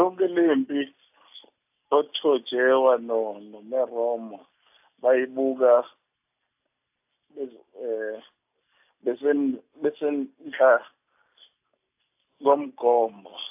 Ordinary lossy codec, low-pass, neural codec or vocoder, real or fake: AAC, 32 kbps; 3.6 kHz; none; real